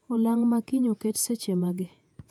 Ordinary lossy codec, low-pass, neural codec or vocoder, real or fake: none; 19.8 kHz; vocoder, 48 kHz, 128 mel bands, Vocos; fake